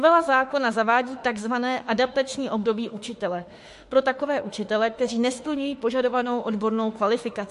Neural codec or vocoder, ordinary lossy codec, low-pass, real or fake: autoencoder, 48 kHz, 32 numbers a frame, DAC-VAE, trained on Japanese speech; MP3, 48 kbps; 14.4 kHz; fake